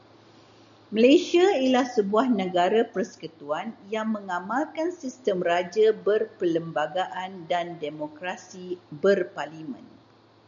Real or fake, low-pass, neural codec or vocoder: real; 7.2 kHz; none